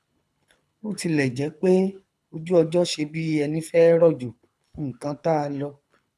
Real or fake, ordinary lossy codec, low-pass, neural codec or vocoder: fake; none; none; codec, 24 kHz, 6 kbps, HILCodec